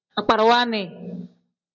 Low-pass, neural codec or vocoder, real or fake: 7.2 kHz; none; real